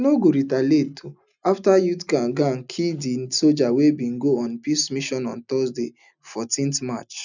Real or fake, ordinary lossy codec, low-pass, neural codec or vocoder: real; none; 7.2 kHz; none